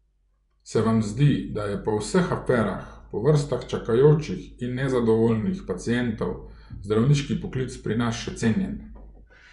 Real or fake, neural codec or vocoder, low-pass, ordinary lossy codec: fake; vocoder, 24 kHz, 100 mel bands, Vocos; 10.8 kHz; none